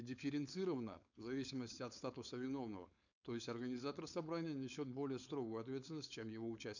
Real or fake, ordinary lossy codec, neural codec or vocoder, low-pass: fake; none; codec, 16 kHz, 4.8 kbps, FACodec; 7.2 kHz